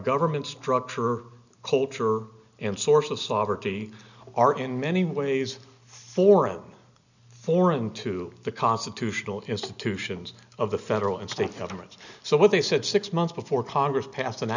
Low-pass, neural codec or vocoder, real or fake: 7.2 kHz; none; real